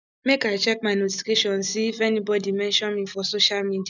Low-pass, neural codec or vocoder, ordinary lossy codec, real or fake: 7.2 kHz; none; none; real